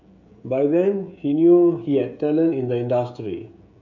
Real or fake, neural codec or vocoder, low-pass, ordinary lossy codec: fake; codec, 16 kHz, 16 kbps, FreqCodec, smaller model; 7.2 kHz; none